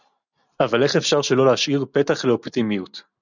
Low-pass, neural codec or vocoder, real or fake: 7.2 kHz; none; real